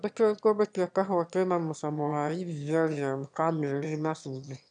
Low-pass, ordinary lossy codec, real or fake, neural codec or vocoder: 9.9 kHz; none; fake; autoencoder, 22.05 kHz, a latent of 192 numbers a frame, VITS, trained on one speaker